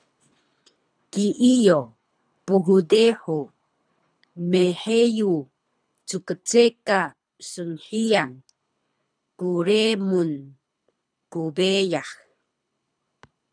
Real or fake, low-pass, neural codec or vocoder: fake; 9.9 kHz; codec, 24 kHz, 3 kbps, HILCodec